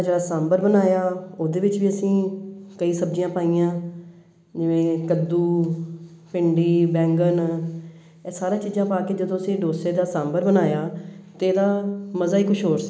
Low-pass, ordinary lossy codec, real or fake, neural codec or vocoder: none; none; real; none